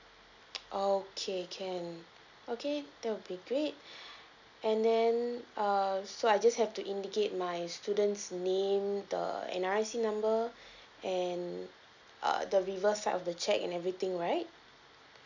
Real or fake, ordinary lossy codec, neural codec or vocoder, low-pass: real; none; none; 7.2 kHz